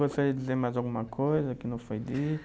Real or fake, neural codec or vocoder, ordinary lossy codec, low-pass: real; none; none; none